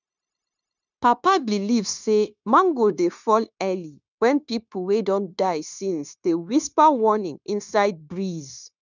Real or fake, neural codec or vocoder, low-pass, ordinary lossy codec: fake; codec, 16 kHz, 0.9 kbps, LongCat-Audio-Codec; 7.2 kHz; none